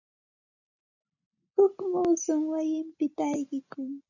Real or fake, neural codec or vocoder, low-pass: real; none; 7.2 kHz